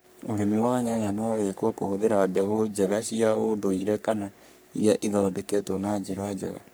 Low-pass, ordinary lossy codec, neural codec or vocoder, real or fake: none; none; codec, 44.1 kHz, 3.4 kbps, Pupu-Codec; fake